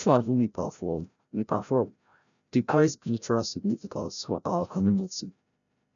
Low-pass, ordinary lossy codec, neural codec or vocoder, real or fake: 7.2 kHz; none; codec, 16 kHz, 0.5 kbps, FreqCodec, larger model; fake